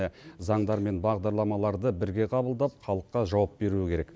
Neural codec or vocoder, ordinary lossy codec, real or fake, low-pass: none; none; real; none